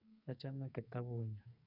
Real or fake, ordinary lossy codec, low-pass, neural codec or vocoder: fake; none; 5.4 kHz; codec, 44.1 kHz, 2.6 kbps, SNAC